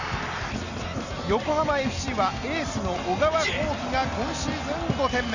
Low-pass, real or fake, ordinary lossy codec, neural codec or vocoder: 7.2 kHz; real; none; none